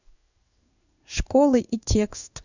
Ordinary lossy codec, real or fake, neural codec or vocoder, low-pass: none; fake; codec, 16 kHz in and 24 kHz out, 1 kbps, XY-Tokenizer; 7.2 kHz